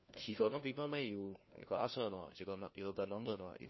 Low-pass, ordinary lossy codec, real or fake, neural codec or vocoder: 7.2 kHz; MP3, 24 kbps; fake; codec, 16 kHz, 1 kbps, FunCodec, trained on LibriTTS, 50 frames a second